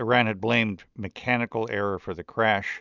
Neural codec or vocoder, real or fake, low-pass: none; real; 7.2 kHz